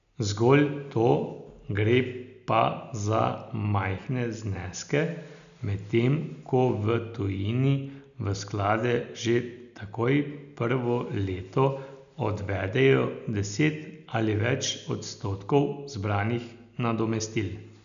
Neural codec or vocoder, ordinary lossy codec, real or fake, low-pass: none; none; real; 7.2 kHz